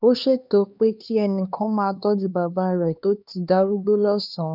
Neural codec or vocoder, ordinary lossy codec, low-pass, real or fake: codec, 16 kHz, 2 kbps, X-Codec, HuBERT features, trained on LibriSpeech; none; 5.4 kHz; fake